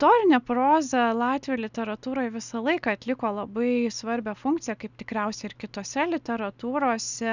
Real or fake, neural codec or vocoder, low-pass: real; none; 7.2 kHz